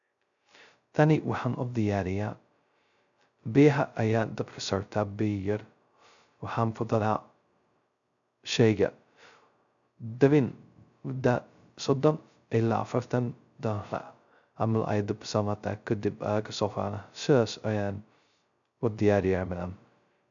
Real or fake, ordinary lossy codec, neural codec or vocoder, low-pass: fake; MP3, 96 kbps; codec, 16 kHz, 0.2 kbps, FocalCodec; 7.2 kHz